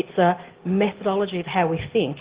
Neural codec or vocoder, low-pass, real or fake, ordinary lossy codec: none; 3.6 kHz; real; Opus, 16 kbps